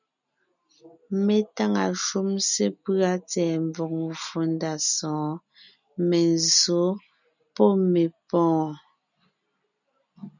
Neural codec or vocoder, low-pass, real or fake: none; 7.2 kHz; real